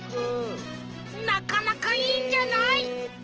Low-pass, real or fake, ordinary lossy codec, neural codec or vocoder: 7.2 kHz; real; Opus, 16 kbps; none